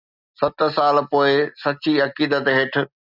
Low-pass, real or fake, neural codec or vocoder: 5.4 kHz; real; none